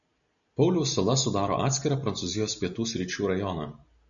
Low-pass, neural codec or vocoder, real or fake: 7.2 kHz; none; real